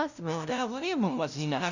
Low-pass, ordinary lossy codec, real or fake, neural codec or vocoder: 7.2 kHz; none; fake; codec, 16 kHz, 0.5 kbps, FunCodec, trained on LibriTTS, 25 frames a second